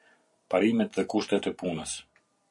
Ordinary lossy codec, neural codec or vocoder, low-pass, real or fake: MP3, 64 kbps; vocoder, 44.1 kHz, 128 mel bands every 256 samples, BigVGAN v2; 10.8 kHz; fake